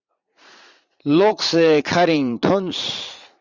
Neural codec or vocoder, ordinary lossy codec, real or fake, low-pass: vocoder, 44.1 kHz, 80 mel bands, Vocos; Opus, 64 kbps; fake; 7.2 kHz